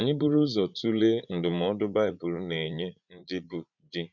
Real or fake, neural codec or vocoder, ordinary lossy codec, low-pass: fake; vocoder, 44.1 kHz, 80 mel bands, Vocos; none; 7.2 kHz